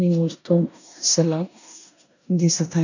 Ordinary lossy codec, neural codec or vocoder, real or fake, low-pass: none; codec, 16 kHz in and 24 kHz out, 0.9 kbps, LongCat-Audio-Codec, four codebook decoder; fake; 7.2 kHz